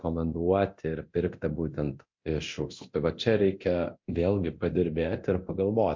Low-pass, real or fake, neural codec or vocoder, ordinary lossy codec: 7.2 kHz; fake; codec, 24 kHz, 0.9 kbps, DualCodec; MP3, 48 kbps